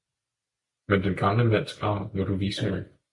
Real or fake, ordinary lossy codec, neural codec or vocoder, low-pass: real; MP3, 48 kbps; none; 10.8 kHz